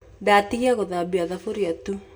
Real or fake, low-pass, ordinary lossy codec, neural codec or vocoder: real; none; none; none